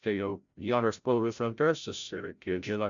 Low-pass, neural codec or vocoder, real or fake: 7.2 kHz; codec, 16 kHz, 0.5 kbps, FreqCodec, larger model; fake